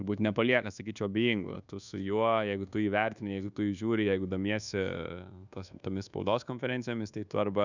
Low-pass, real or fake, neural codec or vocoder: 7.2 kHz; fake; codec, 24 kHz, 1.2 kbps, DualCodec